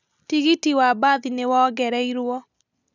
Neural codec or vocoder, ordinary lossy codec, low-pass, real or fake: none; none; 7.2 kHz; real